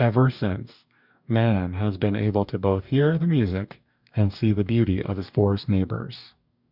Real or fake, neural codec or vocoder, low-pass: fake; codec, 44.1 kHz, 2.6 kbps, DAC; 5.4 kHz